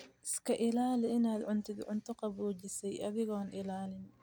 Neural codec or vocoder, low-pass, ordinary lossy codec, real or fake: none; none; none; real